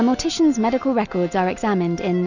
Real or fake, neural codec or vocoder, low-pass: real; none; 7.2 kHz